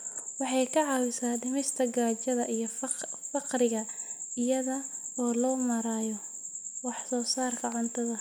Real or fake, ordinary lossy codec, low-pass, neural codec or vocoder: real; none; none; none